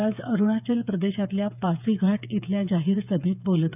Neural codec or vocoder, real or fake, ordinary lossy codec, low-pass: codec, 16 kHz, 8 kbps, FreqCodec, smaller model; fake; none; 3.6 kHz